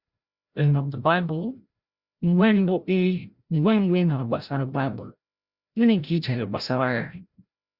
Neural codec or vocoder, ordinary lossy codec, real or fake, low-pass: codec, 16 kHz, 0.5 kbps, FreqCodec, larger model; Opus, 64 kbps; fake; 5.4 kHz